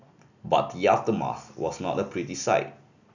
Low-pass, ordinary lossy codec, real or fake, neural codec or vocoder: 7.2 kHz; none; real; none